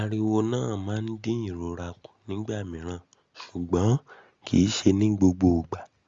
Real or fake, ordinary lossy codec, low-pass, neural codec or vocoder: real; Opus, 32 kbps; 7.2 kHz; none